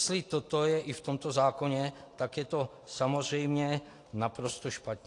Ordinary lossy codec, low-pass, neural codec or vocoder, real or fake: AAC, 48 kbps; 10.8 kHz; none; real